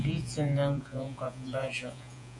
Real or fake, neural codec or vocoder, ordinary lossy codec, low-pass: fake; vocoder, 48 kHz, 128 mel bands, Vocos; AAC, 64 kbps; 10.8 kHz